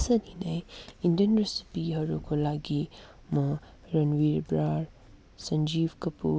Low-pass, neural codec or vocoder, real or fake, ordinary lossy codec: none; none; real; none